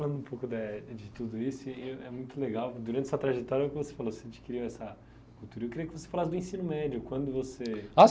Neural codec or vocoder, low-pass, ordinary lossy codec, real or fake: none; none; none; real